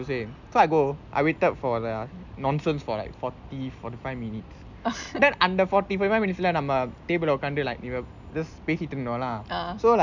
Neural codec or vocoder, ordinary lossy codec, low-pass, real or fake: none; none; 7.2 kHz; real